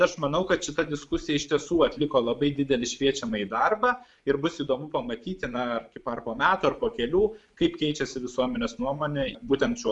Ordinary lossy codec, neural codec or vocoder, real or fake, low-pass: MP3, 96 kbps; vocoder, 24 kHz, 100 mel bands, Vocos; fake; 10.8 kHz